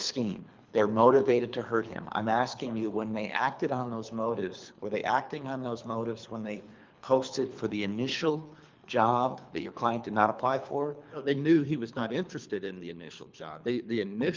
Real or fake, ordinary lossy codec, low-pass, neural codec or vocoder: fake; Opus, 24 kbps; 7.2 kHz; codec, 24 kHz, 3 kbps, HILCodec